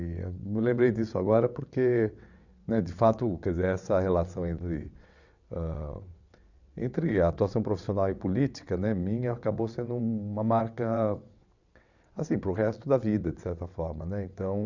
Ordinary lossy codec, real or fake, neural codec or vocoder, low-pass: none; fake; vocoder, 22.05 kHz, 80 mel bands, WaveNeXt; 7.2 kHz